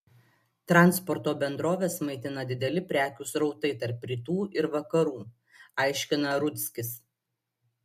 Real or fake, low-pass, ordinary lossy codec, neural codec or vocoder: real; 14.4 kHz; MP3, 64 kbps; none